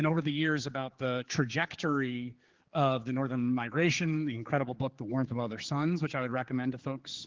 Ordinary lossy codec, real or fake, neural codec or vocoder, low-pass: Opus, 16 kbps; fake; codec, 16 kHz, 4 kbps, X-Codec, HuBERT features, trained on general audio; 7.2 kHz